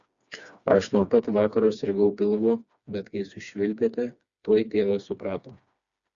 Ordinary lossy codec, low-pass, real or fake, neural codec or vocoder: Opus, 64 kbps; 7.2 kHz; fake; codec, 16 kHz, 2 kbps, FreqCodec, smaller model